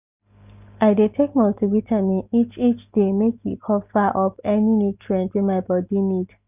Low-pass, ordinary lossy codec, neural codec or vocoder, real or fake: 3.6 kHz; none; none; real